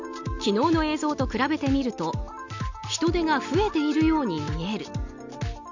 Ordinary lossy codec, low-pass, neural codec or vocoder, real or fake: none; 7.2 kHz; none; real